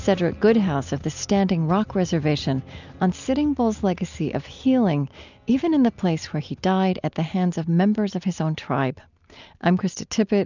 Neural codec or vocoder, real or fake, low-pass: none; real; 7.2 kHz